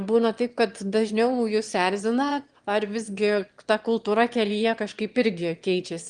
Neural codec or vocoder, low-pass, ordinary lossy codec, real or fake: autoencoder, 22.05 kHz, a latent of 192 numbers a frame, VITS, trained on one speaker; 9.9 kHz; Opus, 24 kbps; fake